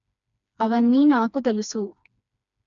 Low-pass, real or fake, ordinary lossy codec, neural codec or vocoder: 7.2 kHz; fake; none; codec, 16 kHz, 2 kbps, FreqCodec, smaller model